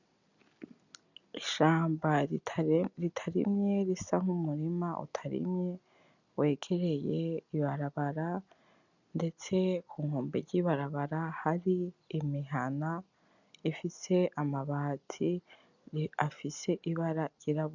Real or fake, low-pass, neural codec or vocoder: real; 7.2 kHz; none